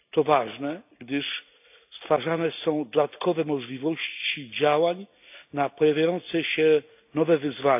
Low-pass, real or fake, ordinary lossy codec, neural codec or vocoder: 3.6 kHz; real; AAC, 32 kbps; none